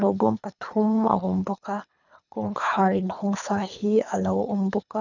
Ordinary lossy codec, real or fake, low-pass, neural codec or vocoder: none; fake; 7.2 kHz; codec, 24 kHz, 3 kbps, HILCodec